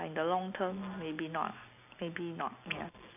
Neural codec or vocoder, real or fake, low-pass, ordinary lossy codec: none; real; 3.6 kHz; none